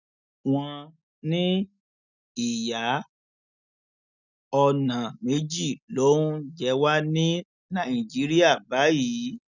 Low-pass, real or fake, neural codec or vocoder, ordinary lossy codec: 7.2 kHz; real; none; none